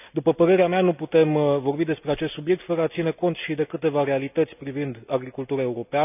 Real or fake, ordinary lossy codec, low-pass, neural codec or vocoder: real; none; 3.6 kHz; none